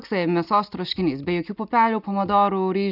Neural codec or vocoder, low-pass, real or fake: none; 5.4 kHz; real